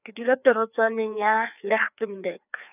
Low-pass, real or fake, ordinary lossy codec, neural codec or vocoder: 3.6 kHz; fake; none; codec, 16 kHz, 2 kbps, FreqCodec, larger model